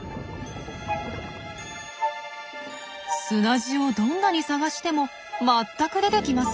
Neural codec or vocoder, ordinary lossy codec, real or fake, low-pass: none; none; real; none